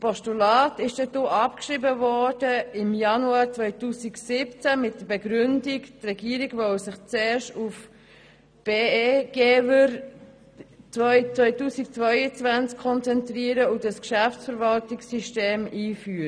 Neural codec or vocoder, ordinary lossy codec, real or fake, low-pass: none; none; real; 9.9 kHz